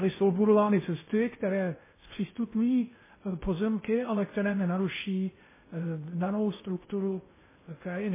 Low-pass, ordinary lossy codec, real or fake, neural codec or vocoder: 3.6 kHz; MP3, 16 kbps; fake; codec, 16 kHz in and 24 kHz out, 0.6 kbps, FocalCodec, streaming, 2048 codes